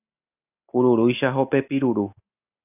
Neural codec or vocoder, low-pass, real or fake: none; 3.6 kHz; real